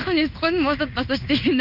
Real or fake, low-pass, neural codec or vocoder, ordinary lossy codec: fake; 5.4 kHz; codec, 16 kHz in and 24 kHz out, 1 kbps, XY-Tokenizer; none